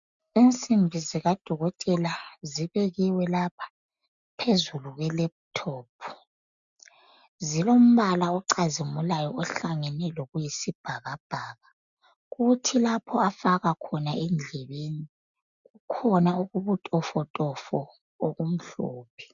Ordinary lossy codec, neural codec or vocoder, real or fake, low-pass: MP3, 96 kbps; none; real; 7.2 kHz